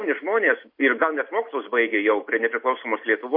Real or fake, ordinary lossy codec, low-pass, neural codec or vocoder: real; MP3, 32 kbps; 5.4 kHz; none